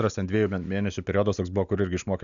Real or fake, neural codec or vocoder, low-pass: real; none; 7.2 kHz